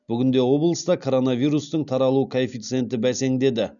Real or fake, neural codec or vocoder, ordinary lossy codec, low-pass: real; none; none; 7.2 kHz